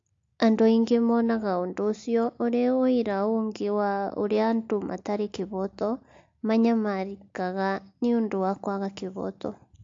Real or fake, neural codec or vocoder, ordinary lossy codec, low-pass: real; none; AAC, 48 kbps; 7.2 kHz